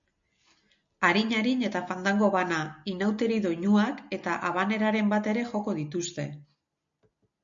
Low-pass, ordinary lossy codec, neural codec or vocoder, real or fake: 7.2 kHz; MP3, 64 kbps; none; real